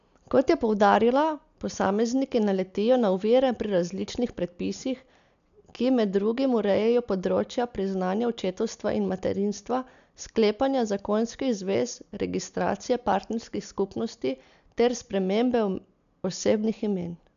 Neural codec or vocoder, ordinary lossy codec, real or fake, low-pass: none; none; real; 7.2 kHz